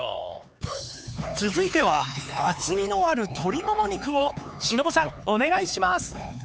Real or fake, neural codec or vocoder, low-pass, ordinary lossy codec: fake; codec, 16 kHz, 4 kbps, X-Codec, HuBERT features, trained on LibriSpeech; none; none